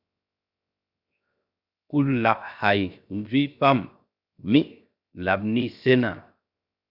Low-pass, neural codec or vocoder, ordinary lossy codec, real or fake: 5.4 kHz; codec, 16 kHz, 0.7 kbps, FocalCodec; Opus, 64 kbps; fake